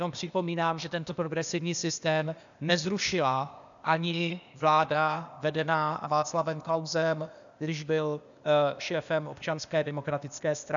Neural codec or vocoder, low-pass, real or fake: codec, 16 kHz, 0.8 kbps, ZipCodec; 7.2 kHz; fake